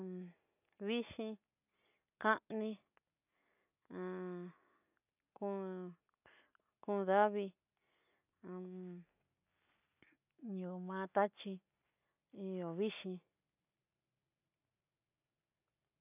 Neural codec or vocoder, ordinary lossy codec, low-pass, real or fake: none; none; 3.6 kHz; real